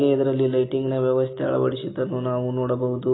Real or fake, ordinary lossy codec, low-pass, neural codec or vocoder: real; AAC, 16 kbps; 7.2 kHz; none